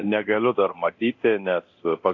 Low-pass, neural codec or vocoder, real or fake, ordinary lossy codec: 7.2 kHz; codec, 24 kHz, 0.9 kbps, DualCodec; fake; AAC, 48 kbps